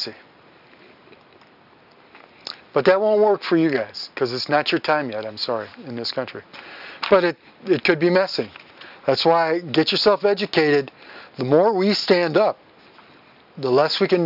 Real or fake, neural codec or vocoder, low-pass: real; none; 5.4 kHz